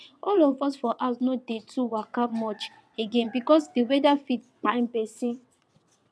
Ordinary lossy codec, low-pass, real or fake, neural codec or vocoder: none; none; fake; vocoder, 22.05 kHz, 80 mel bands, WaveNeXt